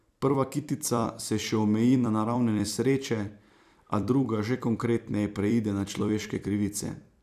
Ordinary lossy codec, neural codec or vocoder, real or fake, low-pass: none; vocoder, 44.1 kHz, 128 mel bands every 256 samples, BigVGAN v2; fake; 14.4 kHz